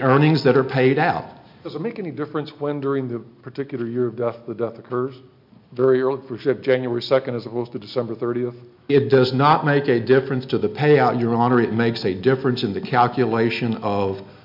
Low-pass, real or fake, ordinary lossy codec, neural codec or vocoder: 5.4 kHz; real; MP3, 48 kbps; none